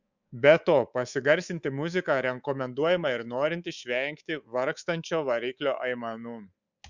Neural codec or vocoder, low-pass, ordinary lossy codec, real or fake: codec, 24 kHz, 3.1 kbps, DualCodec; 7.2 kHz; Opus, 64 kbps; fake